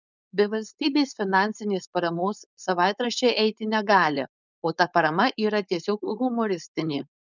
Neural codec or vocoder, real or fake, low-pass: codec, 16 kHz, 4.8 kbps, FACodec; fake; 7.2 kHz